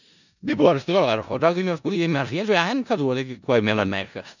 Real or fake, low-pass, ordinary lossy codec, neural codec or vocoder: fake; 7.2 kHz; none; codec, 16 kHz in and 24 kHz out, 0.4 kbps, LongCat-Audio-Codec, four codebook decoder